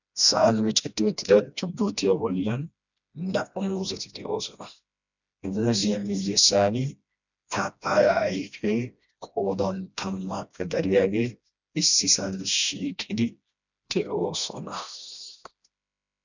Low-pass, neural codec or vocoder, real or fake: 7.2 kHz; codec, 16 kHz, 1 kbps, FreqCodec, smaller model; fake